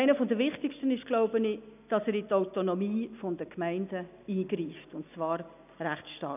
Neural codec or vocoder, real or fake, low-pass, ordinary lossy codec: none; real; 3.6 kHz; none